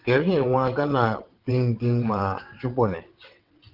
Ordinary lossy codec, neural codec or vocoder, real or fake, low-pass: Opus, 16 kbps; vocoder, 22.05 kHz, 80 mel bands, WaveNeXt; fake; 5.4 kHz